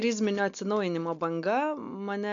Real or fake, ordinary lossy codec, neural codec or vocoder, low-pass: real; MP3, 64 kbps; none; 7.2 kHz